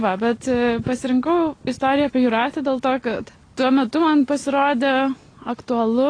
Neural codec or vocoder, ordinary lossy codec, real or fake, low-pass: none; AAC, 32 kbps; real; 9.9 kHz